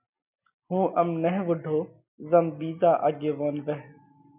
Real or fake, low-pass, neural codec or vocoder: real; 3.6 kHz; none